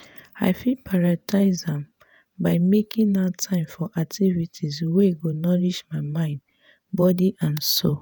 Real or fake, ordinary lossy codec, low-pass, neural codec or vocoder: fake; none; none; vocoder, 48 kHz, 128 mel bands, Vocos